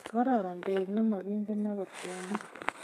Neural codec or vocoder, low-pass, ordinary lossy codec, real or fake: codec, 32 kHz, 1.9 kbps, SNAC; 14.4 kHz; none; fake